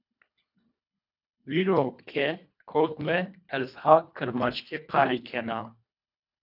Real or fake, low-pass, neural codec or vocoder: fake; 5.4 kHz; codec, 24 kHz, 1.5 kbps, HILCodec